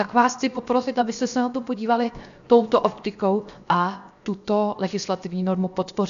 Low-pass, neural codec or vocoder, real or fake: 7.2 kHz; codec, 16 kHz, 0.7 kbps, FocalCodec; fake